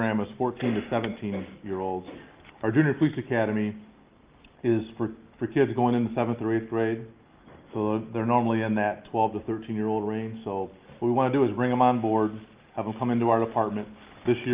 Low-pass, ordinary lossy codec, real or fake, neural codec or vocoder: 3.6 kHz; Opus, 32 kbps; real; none